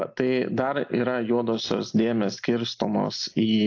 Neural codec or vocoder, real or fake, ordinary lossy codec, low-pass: none; real; AAC, 48 kbps; 7.2 kHz